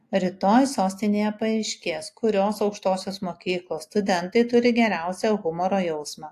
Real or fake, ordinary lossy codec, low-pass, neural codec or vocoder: real; AAC, 64 kbps; 14.4 kHz; none